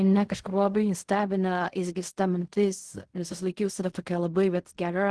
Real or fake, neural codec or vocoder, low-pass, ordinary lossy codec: fake; codec, 16 kHz in and 24 kHz out, 0.4 kbps, LongCat-Audio-Codec, fine tuned four codebook decoder; 10.8 kHz; Opus, 16 kbps